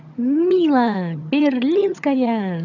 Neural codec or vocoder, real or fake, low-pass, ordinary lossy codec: vocoder, 22.05 kHz, 80 mel bands, HiFi-GAN; fake; 7.2 kHz; none